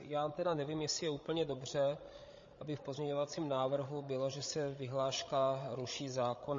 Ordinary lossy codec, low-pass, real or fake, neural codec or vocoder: MP3, 32 kbps; 7.2 kHz; fake; codec, 16 kHz, 16 kbps, FreqCodec, larger model